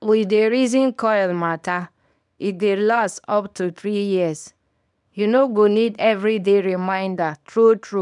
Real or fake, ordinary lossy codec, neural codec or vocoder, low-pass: fake; none; codec, 24 kHz, 0.9 kbps, WavTokenizer, small release; 10.8 kHz